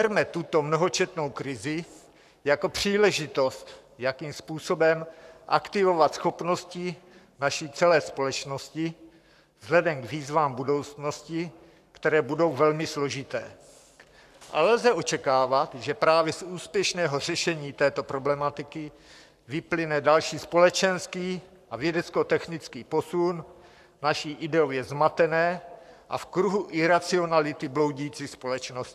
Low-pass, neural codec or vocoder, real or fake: 14.4 kHz; codec, 44.1 kHz, 7.8 kbps, Pupu-Codec; fake